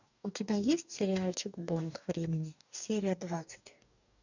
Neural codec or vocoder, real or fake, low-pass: codec, 44.1 kHz, 2.6 kbps, DAC; fake; 7.2 kHz